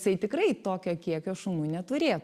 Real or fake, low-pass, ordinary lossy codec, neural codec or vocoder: real; 14.4 kHz; Opus, 64 kbps; none